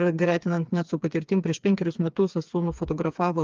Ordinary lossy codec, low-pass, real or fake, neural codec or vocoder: Opus, 24 kbps; 7.2 kHz; fake; codec, 16 kHz, 4 kbps, FreqCodec, smaller model